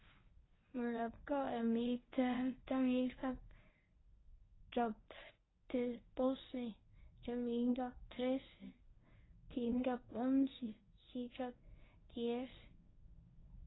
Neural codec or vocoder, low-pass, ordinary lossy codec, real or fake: codec, 24 kHz, 0.9 kbps, WavTokenizer, medium speech release version 1; 7.2 kHz; AAC, 16 kbps; fake